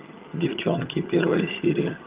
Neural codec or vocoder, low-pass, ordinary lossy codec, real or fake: vocoder, 22.05 kHz, 80 mel bands, HiFi-GAN; 3.6 kHz; Opus, 32 kbps; fake